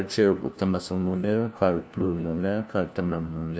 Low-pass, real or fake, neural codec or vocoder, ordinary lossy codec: none; fake; codec, 16 kHz, 1 kbps, FunCodec, trained on LibriTTS, 50 frames a second; none